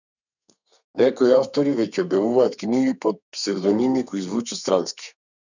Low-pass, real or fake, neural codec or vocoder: 7.2 kHz; fake; codec, 32 kHz, 1.9 kbps, SNAC